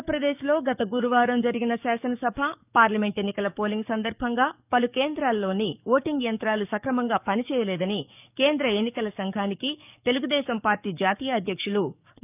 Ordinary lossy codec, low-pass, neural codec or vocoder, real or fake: none; 3.6 kHz; codec, 44.1 kHz, 7.8 kbps, DAC; fake